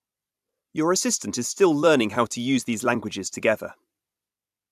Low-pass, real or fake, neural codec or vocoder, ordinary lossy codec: 14.4 kHz; fake; vocoder, 44.1 kHz, 128 mel bands every 256 samples, BigVGAN v2; AAC, 96 kbps